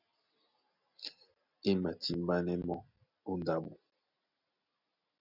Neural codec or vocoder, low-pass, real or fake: none; 5.4 kHz; real